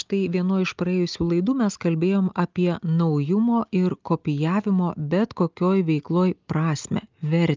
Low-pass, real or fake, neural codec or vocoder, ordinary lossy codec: 7.2 kHz; real; none; Opus, 24 kbps